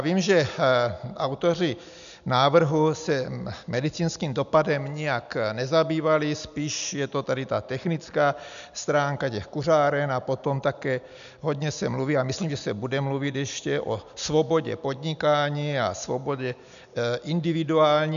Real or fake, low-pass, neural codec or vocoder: real; 7.2 kHz; none